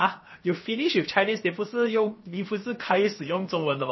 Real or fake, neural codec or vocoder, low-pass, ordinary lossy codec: fake; codec, 16 kHz, 0.7 kbps, FocalCodec; 7.2 kHz; MP3, 24 kbps